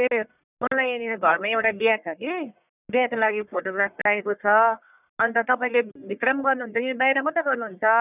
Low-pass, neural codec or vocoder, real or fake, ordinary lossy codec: 3.6 kHz; codec, 44.1 kHz, 1.7 kbps, Pupu-Codec; fake; none